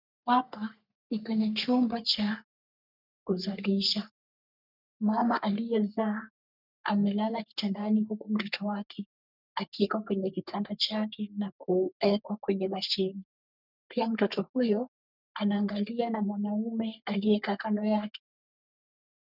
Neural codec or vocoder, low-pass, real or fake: codec, 44.1 kHz, 3.4 kbps, Pupu-Codec; 5.4 kHz; fake